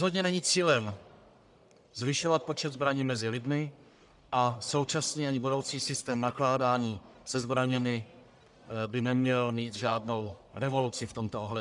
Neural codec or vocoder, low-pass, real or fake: codec, 44.1 kHz, 1.7 kbps, Pupu-Codec; 10.8 kHz; fake